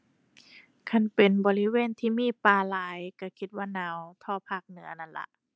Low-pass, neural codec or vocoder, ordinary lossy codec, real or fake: none; none; none; real